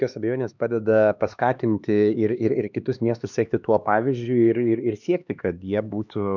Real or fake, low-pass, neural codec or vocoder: fake; 7.2 kHz; codec, 16 kHz, 2 kbps, X-Codec, HuBERT features, trained on LibriSpeech